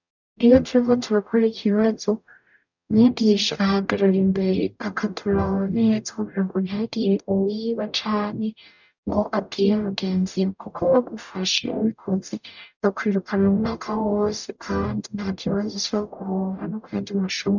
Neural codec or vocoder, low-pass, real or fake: codec, 44.1 kHz, 0.9 kbps, DAC; 7.2 kHz; fake